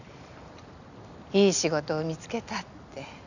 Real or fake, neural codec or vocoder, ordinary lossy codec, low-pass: real; none; none; 7.2 kHz